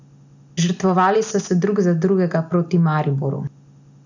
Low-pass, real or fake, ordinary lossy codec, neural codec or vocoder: 7.2 kHz; real; none; none